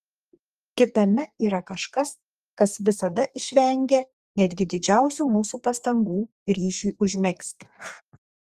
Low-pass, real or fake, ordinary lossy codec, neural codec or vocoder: 14.4 kHz; fake; Opus, 32 kbps; codec, 32 kHz, 1.9 kbps, SNAC